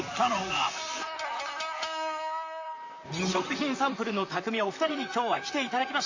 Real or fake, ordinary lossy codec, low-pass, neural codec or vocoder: fake; AAC, 48 kbps; 7.2 kHz; vocoder, 44.1 kHz, 128 mel bands, Pupu-Vocoder